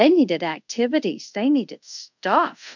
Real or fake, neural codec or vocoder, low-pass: fake; codec, 24 kHz, 0.5 kbps, DualCodec; 7.2 kHz